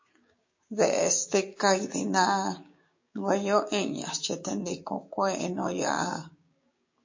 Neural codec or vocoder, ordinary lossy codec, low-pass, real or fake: codec, 16 kHz, 6 kbps, DAC; MP3, 32 kbps; 7.2 kHz; fake